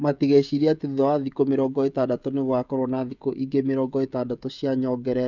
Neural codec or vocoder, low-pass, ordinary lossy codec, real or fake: codec, 16 kHz, 16 kbps, FreqCodec, smaller model; 7.2 kHz; none; fake